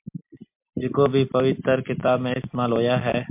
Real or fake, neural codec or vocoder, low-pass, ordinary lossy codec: real; none; 3.6 kHz; MP3, 32 kbps